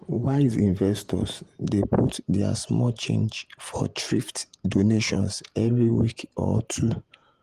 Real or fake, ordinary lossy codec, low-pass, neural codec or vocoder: fake; Opus, 24 kbps; 14.4 kHz; vocoder, 48 kHz, 128 mel bands, Vocos